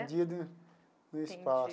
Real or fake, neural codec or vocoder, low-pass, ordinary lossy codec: real; none; none; none